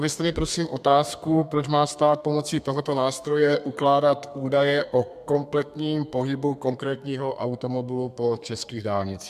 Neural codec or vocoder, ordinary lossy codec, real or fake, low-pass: codec, 32 kHz, 1.9 kbps, SNAC; MP3, 96 kbps; fake; 14.4 kHz